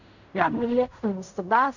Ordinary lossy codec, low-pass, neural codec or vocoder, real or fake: none; 7.2 kHz; codec, 16 kHz in and 24 kHz out, 0.4 kbps, LongCat-Audio-Codec, fine tuned four codebook decoder; fake